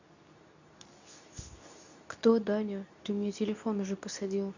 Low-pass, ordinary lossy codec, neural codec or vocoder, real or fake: 7.2 kHz; none; codec, 24 kHz, 0.9 kbps, WavTokenizer, medium speech release version 2; fake